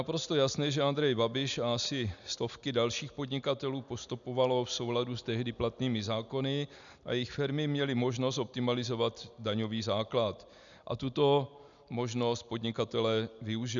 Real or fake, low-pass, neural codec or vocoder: real; 7.2 kHz; none